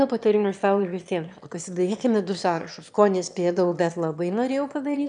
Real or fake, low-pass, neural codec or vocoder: fake; 9.9 kHz; autoencoder, 22.05 kHz, a latent of 192 numbers a frame, VITS, trained on one speaker